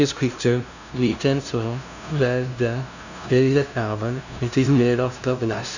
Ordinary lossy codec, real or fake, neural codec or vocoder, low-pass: none; fake; codec, 16 kHz, 0.5 kbps, FunCodec, trained on LibriTTS, 25 frames a second; 7.2 kHz